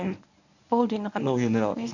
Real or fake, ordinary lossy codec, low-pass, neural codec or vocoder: fake; none; 7.2 kHz; codec, 24 kHz, 0.9 kbps, WavTokenizer, medium speech release version 1